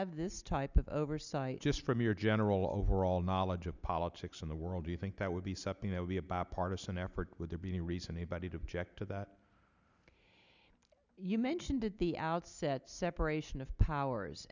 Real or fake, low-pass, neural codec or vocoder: real; 7.2 kHz; none